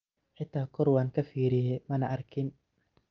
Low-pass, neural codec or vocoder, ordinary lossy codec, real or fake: 7.2 kHz; none; Opus, 24 kbps; real